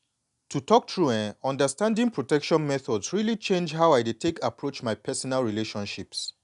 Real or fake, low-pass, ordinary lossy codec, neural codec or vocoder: real; 10.8 kHz; none; none